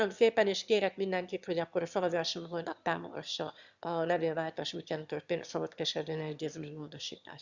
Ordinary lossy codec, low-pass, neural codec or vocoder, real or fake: Opus, 64 kbps; 7.2 kHz; autoencoder, 22.05 kHz, a latent of 192 numbers a frame, VITS, trained on one speaker; fake